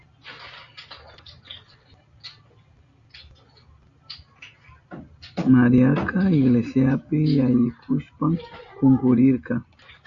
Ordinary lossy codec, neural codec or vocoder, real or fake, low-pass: Opus, 64 kbps; none; real; 7.2 kHz